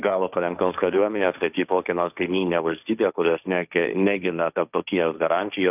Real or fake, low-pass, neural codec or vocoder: fake; 3.6 kHz; codec, 16 kHz, 1.1 kbps, Voila-Tokenizer